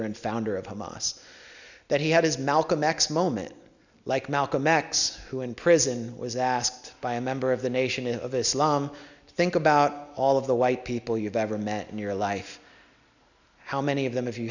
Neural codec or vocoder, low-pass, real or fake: none; 7.2 kHz; real